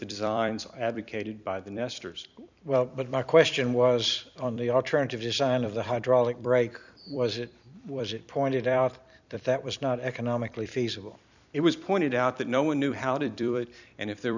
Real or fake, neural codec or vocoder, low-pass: fake; vocoder, 44.1 kHz, 128 mel bands every 256 samples, BigVGAN v2; 7.2 kHz